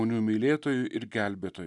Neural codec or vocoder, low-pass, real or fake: none; 10.8 kHz; real